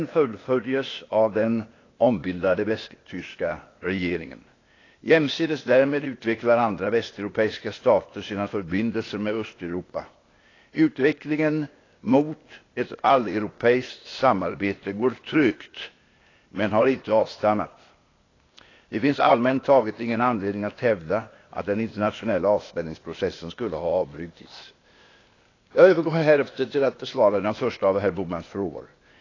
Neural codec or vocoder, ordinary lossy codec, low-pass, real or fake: codec, 16 kHz, 0.8 kbps, ZipCodec; AAC, 32 kbps; 7.2 kHz; fake